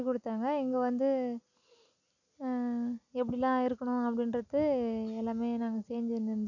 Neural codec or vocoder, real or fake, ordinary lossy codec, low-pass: none; real; none; 7.2 kHz